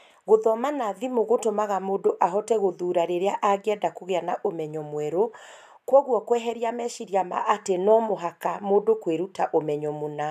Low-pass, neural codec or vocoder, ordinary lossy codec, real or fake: 14.4 kHz; none; none; real